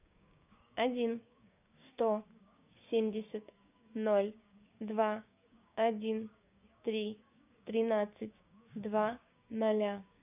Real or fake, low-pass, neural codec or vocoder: fake; 3.6 kHz; codec, 16 kHz, 6 kbps, DAC